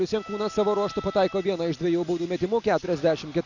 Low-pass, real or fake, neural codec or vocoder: 7.2 kHz; real; none